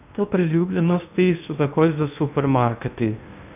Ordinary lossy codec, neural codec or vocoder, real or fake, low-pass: none; codec, 16 kHz in and 24 kHz out, 0.8 kbps, FocalCodec, streaming, 65536 codes; fake; 3.6 kHz